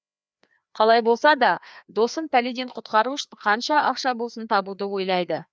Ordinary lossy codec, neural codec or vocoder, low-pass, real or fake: none; codec, 16 kHz, 2 kbps, FreqCodec, larger model; none; fake